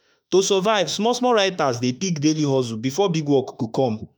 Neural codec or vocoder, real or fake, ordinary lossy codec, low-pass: autoencoder, 48 kHz, 32 numbers a frame, DAC-VAE, trained on Japanese speech; fake; none; none